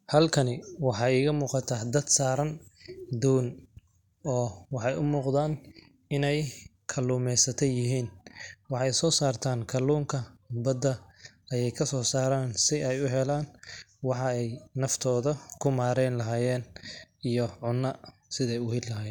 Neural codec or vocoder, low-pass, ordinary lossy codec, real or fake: none; 19.8 kHz; none; real